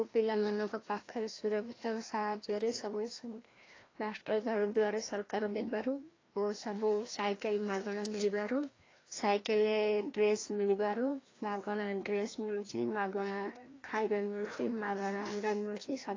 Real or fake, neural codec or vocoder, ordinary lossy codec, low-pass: fake; codec, 16 kHz, 1 kbps, FreqCodec, larger model; AAC, 32 kbps; 7.2 kHz